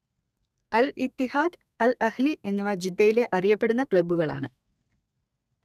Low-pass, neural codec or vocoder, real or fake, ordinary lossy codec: 14.4 kHz; codec, 32 kHz, 1.9 kbps, SNAC; fake; none